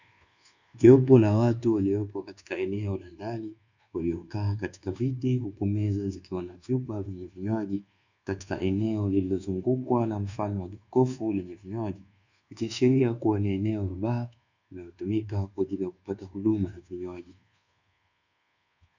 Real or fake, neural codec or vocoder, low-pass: fake; codec, 24 kHz, 1.2 kbps, DualCodec; 7.2 kHz